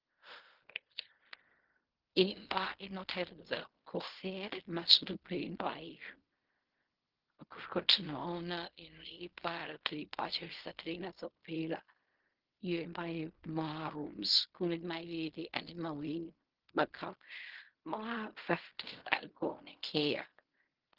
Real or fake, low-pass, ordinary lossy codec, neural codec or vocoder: fake; 5.4 kHz; Opus, 16 kbps; codec, 16 kHz in and 24 kHz out, 0.4 kbps, LongCat-Audio-Codec, fine tuned four codebook decoder